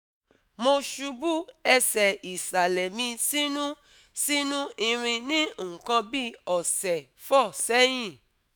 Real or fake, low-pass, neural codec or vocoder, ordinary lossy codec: fake; none; autoencoder, 48 kHz, 128 numbers a frame, DAC-VAE, trained on Japanese speech; none